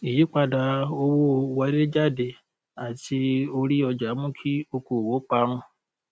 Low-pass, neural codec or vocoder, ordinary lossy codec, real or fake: none; none; none; real